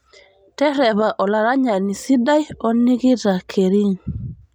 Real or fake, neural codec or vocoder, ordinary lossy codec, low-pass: real; none; none; 19.8 kHz